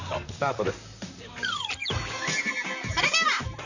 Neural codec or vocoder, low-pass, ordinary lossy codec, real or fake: vocoder, 44.1 kHz, 128 mel bands, Pupu-Vocoder; 7.2 kHz; none; fake